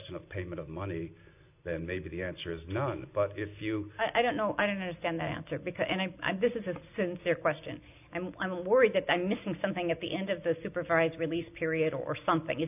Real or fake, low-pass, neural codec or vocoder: real; 3.6 kHz; none